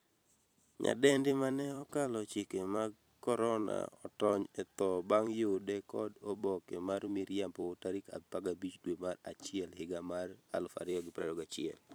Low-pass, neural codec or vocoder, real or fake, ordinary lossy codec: none; vocoder, 44.1 kHz, 128 mel bands every 256 samples, BigVGAN v2; fake; none